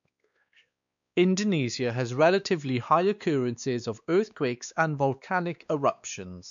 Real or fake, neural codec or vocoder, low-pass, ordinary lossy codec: fake; codec, 16 kHz, 2 kbps, X-Codec, WavLM features, trained on Multilingual LibriSpeech; 7.2 kHz; none